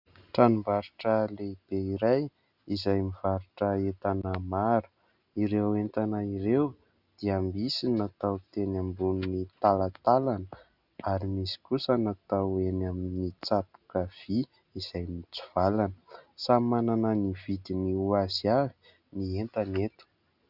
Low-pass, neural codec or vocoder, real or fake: 5.4 kHz; none; real